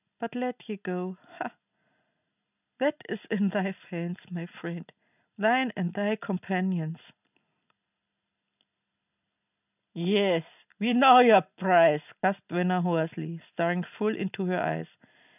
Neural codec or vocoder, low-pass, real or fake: none; 3.6 kHz; real